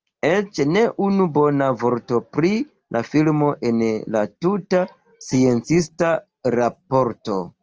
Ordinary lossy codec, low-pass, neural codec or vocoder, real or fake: Opus, 24 kbps; 7.2 kHz; none; real